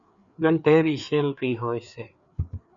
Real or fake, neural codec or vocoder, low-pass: fake; codec, 16 kHz, 4 kbps, FreqCodec, larger model; 7.2 kHz